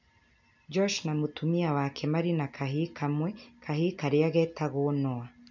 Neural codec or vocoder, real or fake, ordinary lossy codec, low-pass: none; real; none; 7.2 kHz